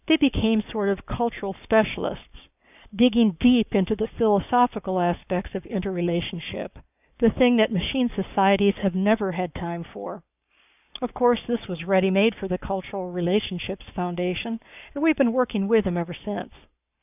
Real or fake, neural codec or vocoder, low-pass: fake; codec, 44.1 kHz, 7.8 kbps, Pupu-Codec; 3.6 kHz